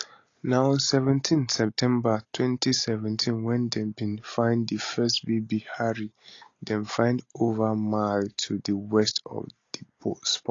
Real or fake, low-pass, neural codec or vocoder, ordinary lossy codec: real; 7.2 kHz; none; AAC, 32 kbps